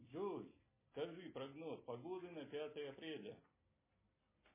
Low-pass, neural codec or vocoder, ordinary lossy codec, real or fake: 3.6 kHz; none; MP3, 16 kbps; real